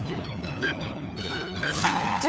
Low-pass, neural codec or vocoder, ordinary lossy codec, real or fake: none; codec, 16 kHz, 4 kbps, FunCodec, trained on LibriTTS, 50 frames a second; none; fake